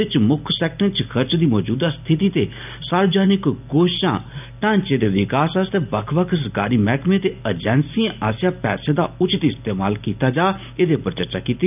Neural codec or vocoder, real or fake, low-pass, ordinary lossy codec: none; real; 3.6 kHz; none